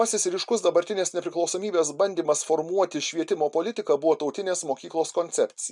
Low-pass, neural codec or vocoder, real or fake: 10.8 kHz; none; real